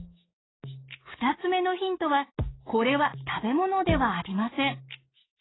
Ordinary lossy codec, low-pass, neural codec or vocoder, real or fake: AAC, 16 kbps; 7.2 kHz; codec, 44.1 kHz, 7.8 kbps, DAC; fake